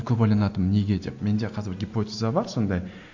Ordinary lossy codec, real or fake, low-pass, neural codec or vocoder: AAC, 48 kbps; real; 7.2 kHz; none